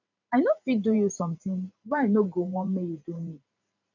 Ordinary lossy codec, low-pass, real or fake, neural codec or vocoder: none; 7.2 kHz; fake; vocoder, 44.1 kHz, 128 mel bands every 512 samples, BigVGAN v2